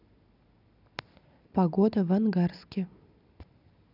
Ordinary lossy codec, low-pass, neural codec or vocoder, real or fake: none; 5.4 kHz; none; real